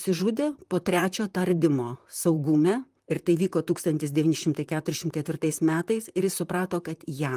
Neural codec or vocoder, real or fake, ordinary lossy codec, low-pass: vocoder, 44.1 kHz, 128 mel bands, Pupu-Vocoder; fake; Opus, 32 kbps; 14.4 kHz